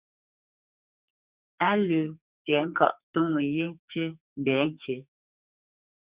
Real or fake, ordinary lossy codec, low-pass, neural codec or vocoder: fake; Opus, 64 kbps; 3.6 kHz; codec, 44.1 kHz, 2.6 kbps, SNAC